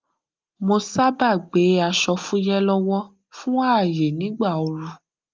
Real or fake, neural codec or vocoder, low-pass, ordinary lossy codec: real; none; 7.2 kHz; Opus, 24 kbps